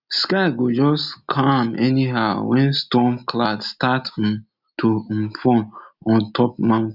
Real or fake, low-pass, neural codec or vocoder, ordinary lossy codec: real; 5.4 kHz; none; none